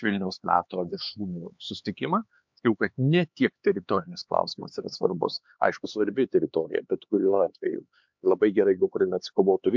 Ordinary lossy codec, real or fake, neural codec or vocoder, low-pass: MP3, 64 kbps; fake; codec, 16 kHz, 4 kbps, X-Codec, HuBERT features, trained on LibriSpeech; 7.2 kHz